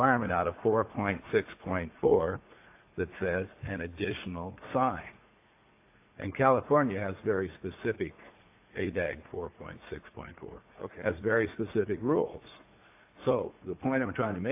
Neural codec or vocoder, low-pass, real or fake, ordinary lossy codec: vocoder, 44.1 kHz, 80 mel bands, Vocos; 3.6 kHz; fake; AAC, 24 kbps